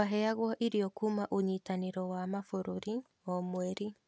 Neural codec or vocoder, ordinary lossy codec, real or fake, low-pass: none; none; real; none